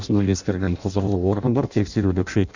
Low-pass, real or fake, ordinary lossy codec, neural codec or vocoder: 7.2 kHz; fake; none; codec, 16 kHz in and 24 kHz out, 0.6 kbps, FireRedTTS-2 codec